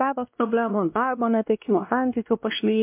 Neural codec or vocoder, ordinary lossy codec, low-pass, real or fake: codec, 16 kHz, 1 kbps, X-Codec, WavLM features, trained on Multilingual LibriSpeech; MP3, 32 kbps; 3.6 kHz; fake